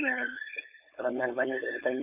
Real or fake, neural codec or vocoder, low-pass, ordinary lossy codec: fake; codec, 16 kHz, 4.8 kbps, FACodec; 3.6 kHz; none